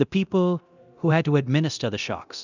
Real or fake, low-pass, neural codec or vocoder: fake; 7.2 kHz; codec, 24 kHz, 0.9 kbps, DualCodec